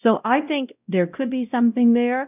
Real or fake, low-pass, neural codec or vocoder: fake; 3.6 kHz; codec, 16 kHz, 0.5 kbps, X-Codec, WavLM features, trained on Multilingual LibriSpeech